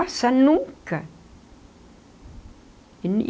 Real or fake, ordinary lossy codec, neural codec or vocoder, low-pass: real; none; none; none